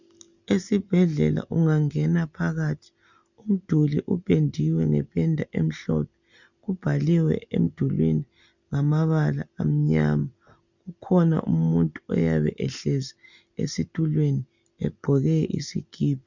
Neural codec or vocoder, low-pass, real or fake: none; 7.2 kHz; real